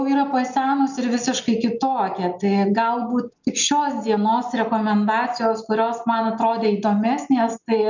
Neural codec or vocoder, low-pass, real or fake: none; 7.2 kHz; real